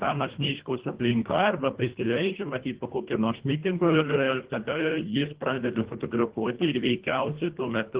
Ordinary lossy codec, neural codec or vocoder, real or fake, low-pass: Opus, 16 kbps; codec, 24 kHz, 1.5 kbps, HILCodec; fake; 3.6 kHz